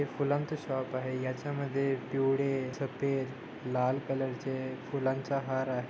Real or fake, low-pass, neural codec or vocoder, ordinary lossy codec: real; none; none; none